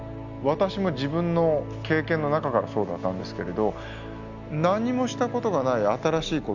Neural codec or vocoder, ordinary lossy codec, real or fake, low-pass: none; none; real; 7.2 kHz